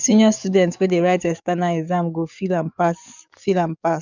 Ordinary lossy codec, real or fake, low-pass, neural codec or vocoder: none; fake; 7.2 kHz; codec, 44.1 kHz, 7.8 kbps, DAC